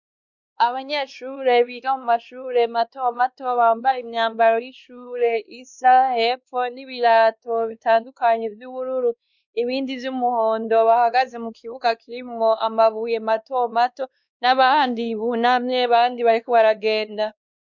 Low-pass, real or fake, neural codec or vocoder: 7.2 kHz; fake; codec, 16 kHz, 2 kbps, X-Codec, WavLM features, trained on Multilingual LibriSpeech